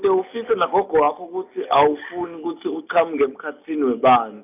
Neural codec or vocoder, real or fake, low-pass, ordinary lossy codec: none; real; 3.6 kHz; none